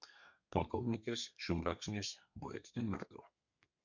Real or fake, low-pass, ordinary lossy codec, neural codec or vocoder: fake; 7.2 kHz; Opus, 64 kbps; codec, 32 kHz, 1.9 kbps, SNAC